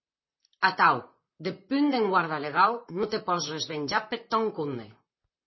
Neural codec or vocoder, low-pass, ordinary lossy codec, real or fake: vocoder, 44.1 kHz, 128 mel bands, Pupu-Vocoder; 7.2 kHz; MP3, 24 kbps; fake